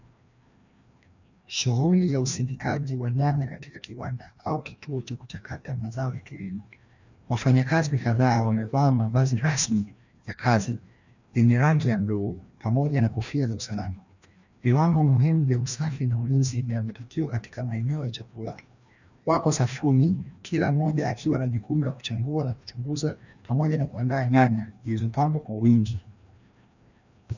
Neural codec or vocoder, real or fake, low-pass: codec, 16 kHz, 1 kbps, FreqCodec, larger model; fake; 7.2 kHz